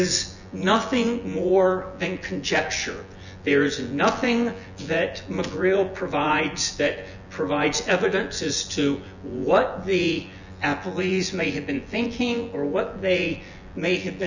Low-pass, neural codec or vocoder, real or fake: 7.2 kHz; vocoder, 24 kHz, 100 mel bands, Vocos; fake